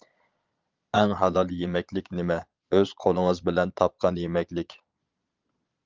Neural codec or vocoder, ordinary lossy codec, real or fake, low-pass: vocoder, 22.05 kHz, 80 mel bands, Vocos; Opus, 24 kbps; fake; 7.2 kHz